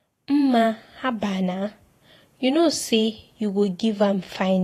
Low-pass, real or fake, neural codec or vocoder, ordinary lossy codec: 14.4 kHz; fake; vocoder, 48 kHz, 128 mel bands, Vocos; AAC, 48 kbps